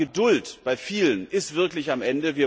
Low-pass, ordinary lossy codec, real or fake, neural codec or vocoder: none; none; real; none